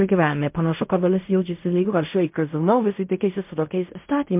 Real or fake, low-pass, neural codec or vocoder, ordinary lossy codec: fake; 3.6 kHz; codec, 16 kHz in and 24 kHz out, 0.4 kbps, LongCat-Audio-Codec, fine tuned four codebook decoder; MP3, 32 kbps